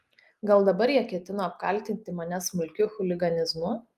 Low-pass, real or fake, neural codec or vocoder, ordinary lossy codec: 14.4 kHz; real; none; Opus, 32 kbps